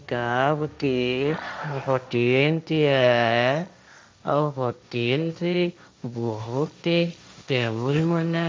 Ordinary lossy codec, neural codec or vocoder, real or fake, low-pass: none; codec, 16 kHz, 1.1 kbps, Voila-Tokenizer; fake; none